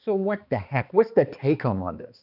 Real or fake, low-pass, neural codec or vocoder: fake; 5.4 kHz; codec, 16 kHz, 2 kbps, X-Codec, HuBERT features, trained on balanced general audio